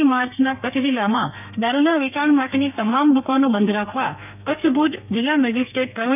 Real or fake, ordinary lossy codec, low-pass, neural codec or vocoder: fake; none; 3.6 kHz; codec, 32 kHz, 1.9 kbps, SNAC